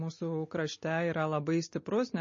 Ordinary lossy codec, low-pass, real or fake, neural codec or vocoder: MP3, 32 kbps; 7.2 kHz; real; none